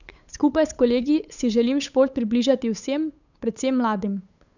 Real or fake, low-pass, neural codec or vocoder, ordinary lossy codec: fake; 7.2 kHz; codec, 16 kHz, 8 kbps, FunCodec, trained on Chinese and English, 25 frames a second; none